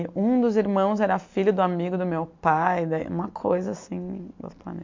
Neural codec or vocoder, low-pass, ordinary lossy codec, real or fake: none; 7.2 kHz; MP3, 48 kbps; real